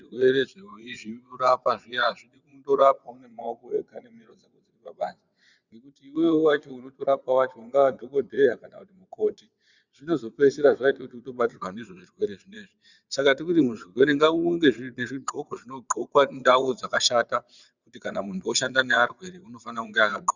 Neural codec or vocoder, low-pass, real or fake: vocoder, 22.05 kHz, 80 mel bands, WaveNeXt; 7.2 kHz; fake